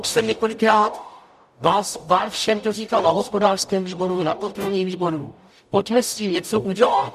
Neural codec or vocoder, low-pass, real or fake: codec, 44.1 kHz, 0.9 kbps, DAC; 14.4 kHz; fake